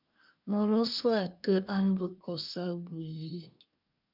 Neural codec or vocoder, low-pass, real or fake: codec, 24 kHz, 1 kbps, SNAC; 5.4 kHz; fake